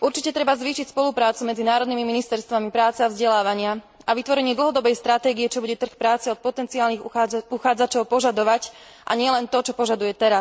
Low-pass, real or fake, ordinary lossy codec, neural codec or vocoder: none; real; none; none